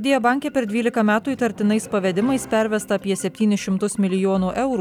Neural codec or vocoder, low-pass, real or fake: none; 19.8 kHz; real